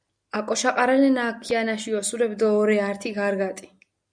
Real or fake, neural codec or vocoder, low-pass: real; none; 9.9 kHz